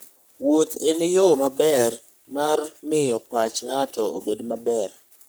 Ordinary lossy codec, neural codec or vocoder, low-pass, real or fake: none; codec, 44.1 kHz, 3.4 kbps, Pupu-Codec; none; fake